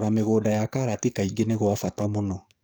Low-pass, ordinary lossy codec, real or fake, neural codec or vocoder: none; none; fake; codec, 44.1 kHz, 7.8 kbps, DAC